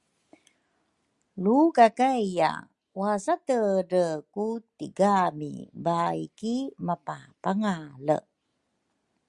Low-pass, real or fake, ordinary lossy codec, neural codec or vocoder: 10.8 kHz; real; Opus, 64 kbps; none